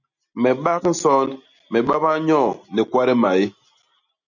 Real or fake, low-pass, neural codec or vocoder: real; 7.2 kHz; none